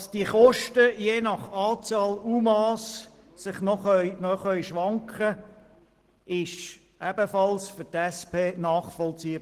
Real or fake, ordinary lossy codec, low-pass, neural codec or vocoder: real; Opus, 16 kbps; 14.4 kHz; none